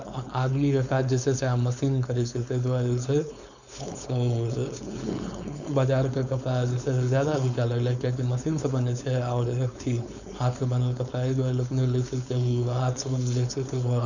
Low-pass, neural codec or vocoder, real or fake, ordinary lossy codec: 7.2 kHz; codec, 16 kHz, 4.8 kbps, FACodec; fake; none